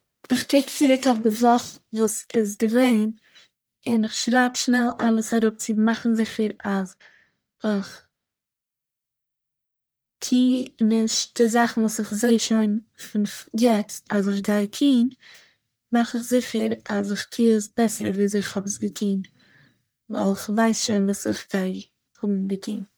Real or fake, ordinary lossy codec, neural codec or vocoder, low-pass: fake; none; codec, 44.1 kHz, 1.7 kbps, Pupu-Codec; none